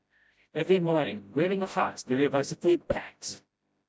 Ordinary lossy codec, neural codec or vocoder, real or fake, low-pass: none; codec, 16 kHz, 0.5 kbps, FreqCodec, smaller model; fake; none